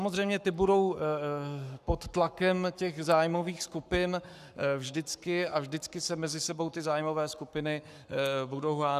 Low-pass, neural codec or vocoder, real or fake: 14.4 kHz; codec, 44.1 kHz, 7.8 kbps, Pupu-Codec; fake